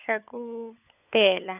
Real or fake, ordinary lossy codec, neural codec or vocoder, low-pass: fake; none; codec, 16 kHz, 16 kbps, FunCodec, trained on LibriTTS, 50 frames a second; 3.6 kHz